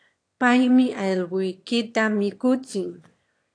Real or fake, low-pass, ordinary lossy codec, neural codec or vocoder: fake; 9.9 kHz; AAC, 48 kbps; autoencoder, 22.05 kHz, a latent of 192 numbers a frame, VITS, trained on one speaker